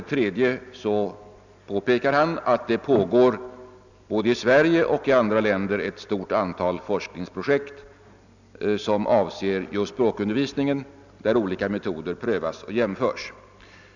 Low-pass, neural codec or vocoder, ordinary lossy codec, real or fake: 7.2 kHz; none; none; real